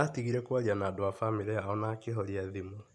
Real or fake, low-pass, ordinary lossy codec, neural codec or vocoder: real; none; none; none